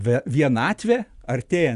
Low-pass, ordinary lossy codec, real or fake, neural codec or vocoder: 10.8 kHz; Opus, 64 kbps; real; none